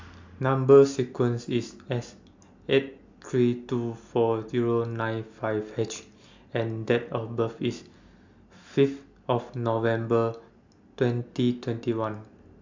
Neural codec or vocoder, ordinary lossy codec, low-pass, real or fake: none; MP3, 64 kbps; 7.2 kHz; real